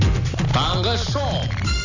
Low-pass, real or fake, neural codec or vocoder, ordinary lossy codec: 7.2 kHz; real; none; none